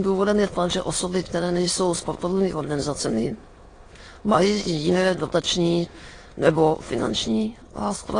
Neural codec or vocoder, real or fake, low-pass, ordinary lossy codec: autoencoder, 22.05 kHz, a latent of 192 numbers a frame, VITS, trained on many speakers; fake; 9.9 kHz; AAC, 32 kbps